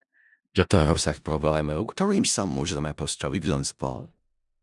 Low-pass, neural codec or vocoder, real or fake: 10.8 kHz; codec, 16 kHz in and 24 kHz out, 0.4 kbps, LongCat-Audio-Codec, four codebook decoder; fake